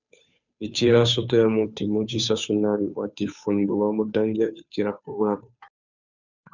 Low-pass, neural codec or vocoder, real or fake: 7.2 kHz; codec, 16 kHz, 2 kbps, FunCodec, trained on Chinese and English, 25 frames a second; fake